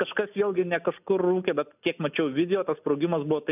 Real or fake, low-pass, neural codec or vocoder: real; 3.6 kHz; none